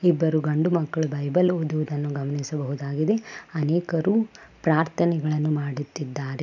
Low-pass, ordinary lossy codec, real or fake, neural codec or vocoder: 7.2 kHz; none; real; none